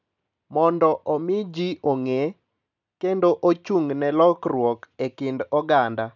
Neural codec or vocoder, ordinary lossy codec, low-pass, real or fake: none; none; 7.2 kHz; real